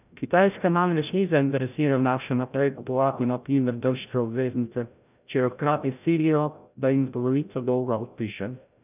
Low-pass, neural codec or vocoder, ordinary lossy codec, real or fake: 3.6 kHz; codec, 16 kHz, 0.5 kbps, FreqCodec, larger model; none; fake